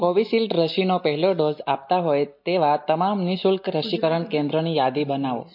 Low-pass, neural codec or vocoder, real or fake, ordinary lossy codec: 5.4 kHz; none; real; MP3, 32 kbps